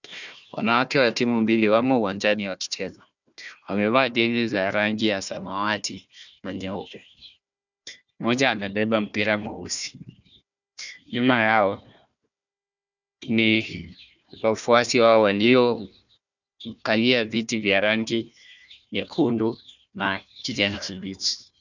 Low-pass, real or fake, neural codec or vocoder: 7.2 kHz; fake; codec, 16 kHz, 1 kbps, FunCodec, trained on Chinese and English, 50 frames a second